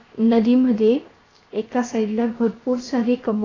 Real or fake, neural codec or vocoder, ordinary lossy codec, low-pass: fake; codec, 16 kHz, 0.7 kbps, FocalCodec; AAC, 32 kbps; 7.2 kHz